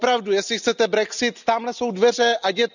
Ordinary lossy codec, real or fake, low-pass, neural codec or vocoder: none; real; 7.2 kHz; none